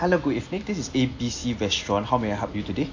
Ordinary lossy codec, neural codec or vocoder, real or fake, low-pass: AAC, 48 kbps; none; real; 7.2 kHz